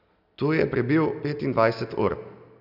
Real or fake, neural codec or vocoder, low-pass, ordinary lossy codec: real; none; 5.4 kHz; none